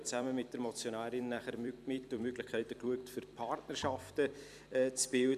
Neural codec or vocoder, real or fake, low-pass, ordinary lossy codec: vocoder, 44.1 kHz, 128 mel bands every 256 samples, BigVGAN v2; fake; 14.4 kHz; none